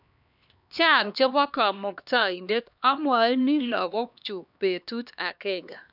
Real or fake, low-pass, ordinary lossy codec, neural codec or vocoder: fake; 5.4 kHz; none; codec, 16 kHz, 2 kbps, X-Codec, HuBERT features, trained on LibriSpeech